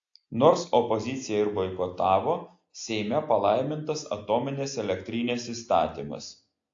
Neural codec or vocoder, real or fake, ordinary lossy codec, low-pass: none; real; AAC, 48 kbps; 7.2 kHz